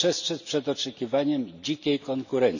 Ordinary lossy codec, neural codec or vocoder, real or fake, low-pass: none; none; real; 7.2 kHz